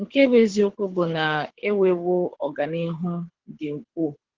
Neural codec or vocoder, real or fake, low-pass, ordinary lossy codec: codec, 24 kHz, 6 kbps, HILCodec; fake; 7.2 kHz; Opus, 16 kbps